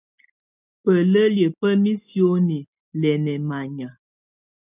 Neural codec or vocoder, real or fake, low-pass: none; real; 3.6 kHz